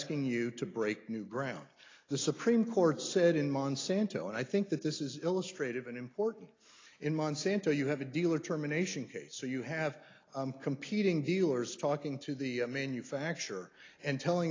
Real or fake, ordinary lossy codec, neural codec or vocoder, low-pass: real; AAC, 32 kbps; none; 7.2 kHz